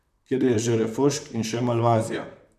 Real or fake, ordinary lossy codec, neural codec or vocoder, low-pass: fake; none; vocoder, 44.1 kHz, 128 mel bands, Pupu-Vocoder; 14.4 kHz